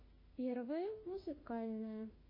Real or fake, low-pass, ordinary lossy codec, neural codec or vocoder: fake; 5.4 kHz; MP3, 24 kbps; autoencoder, 48 kHz, 32 numbers a frame, DAC-VAE, trained on Japanese speech